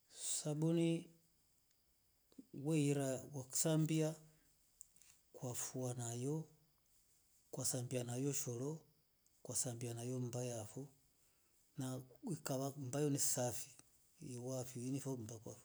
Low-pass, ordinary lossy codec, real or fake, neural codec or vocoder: none; none; real; none